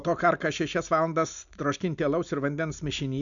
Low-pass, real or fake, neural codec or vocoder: 7.2 kHz; real; none